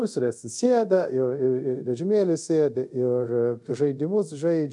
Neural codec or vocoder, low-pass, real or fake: codec, 24 kHz, 0.5 kbps, DualCodec; 10.8 kHz; fake